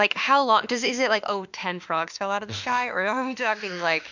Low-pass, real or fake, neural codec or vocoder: 7.2 kHz; fake; autoencoder, 48 kHz, 32 numbers a frame, DAC-VAE, trained on Japanese speech